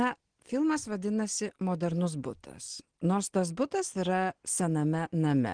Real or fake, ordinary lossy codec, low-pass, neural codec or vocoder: real; Opus, 16 kbps; 9.9 kHz; none